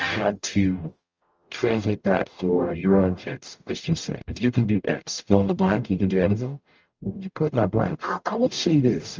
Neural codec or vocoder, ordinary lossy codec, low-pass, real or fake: codec, 44.1 kHz, 0.9 kbps, DAC; Opus, 24 kbps; 7.2 kHz; fake